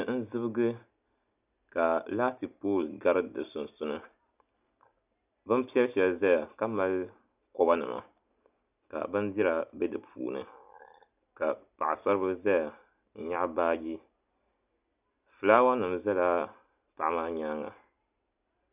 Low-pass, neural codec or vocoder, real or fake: 3.6 kHz; none; real